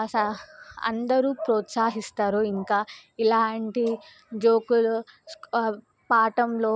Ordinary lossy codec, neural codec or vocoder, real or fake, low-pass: none; none; real; none